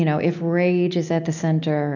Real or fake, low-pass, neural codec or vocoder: real; 7.2 kHz; none